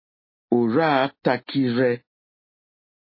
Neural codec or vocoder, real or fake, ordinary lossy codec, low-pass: none; real; MP3, 24 kbps; 5.4 kHz